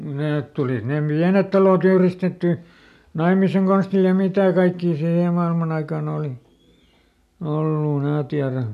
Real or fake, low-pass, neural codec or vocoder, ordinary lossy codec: real; 14.4 kHz; none; none